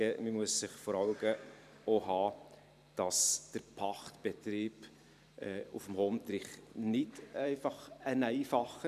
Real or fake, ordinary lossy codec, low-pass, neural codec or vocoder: fake; none; 14.4 kHz; vocoder, 44.1 kHz, 128 mel bands every 512 samples, BigVGAN v2